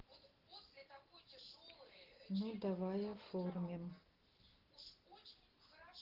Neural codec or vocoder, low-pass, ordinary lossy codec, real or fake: none; 5.4 kHz; Opus, 16 kbps; real